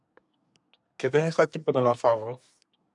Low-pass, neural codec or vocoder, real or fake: 10.8 kHz; codec, 24 kHz, 1 kbps, SNAC; fake